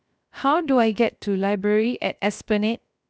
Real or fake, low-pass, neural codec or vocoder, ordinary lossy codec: fake; none; codec, 16 kHz, 0.7 kbps, FocalCodec; none